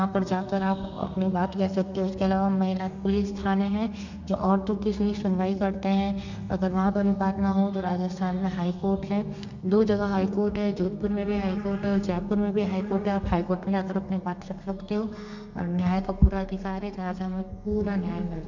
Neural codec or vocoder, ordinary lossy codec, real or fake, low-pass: codec, 32 kHz, 1.9 kbps, SNAC; none; fake; 7.2 kHz